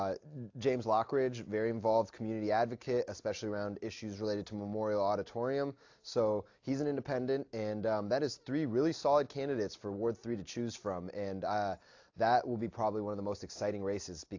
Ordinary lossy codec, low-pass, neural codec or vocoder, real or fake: AAC, 48 kbps; 7.2 kHz; none; real